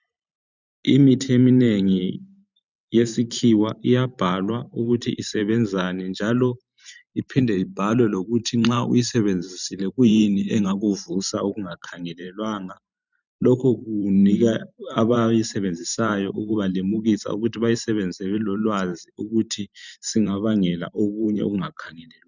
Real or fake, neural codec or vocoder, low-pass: fake; vocoder, 44.1 kHz, 128 mel bands every 512 samples, BigVGAN v2; 7.2 kHz